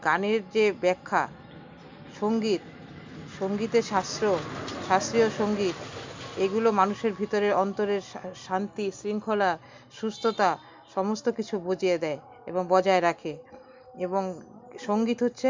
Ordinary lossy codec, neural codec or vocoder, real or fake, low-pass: MP3, 64 kbps; none; real; 7.2 kHz